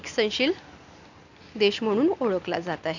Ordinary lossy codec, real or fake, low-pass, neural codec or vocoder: none; real; 7.2 kHz; none